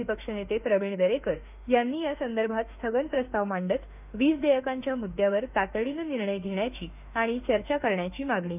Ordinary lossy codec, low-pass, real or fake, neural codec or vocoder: none; 3.6 kHz; fake; autoencoder, 48 kHz, 32 numbers a frame, DAC-VAE, trained on Japanese speech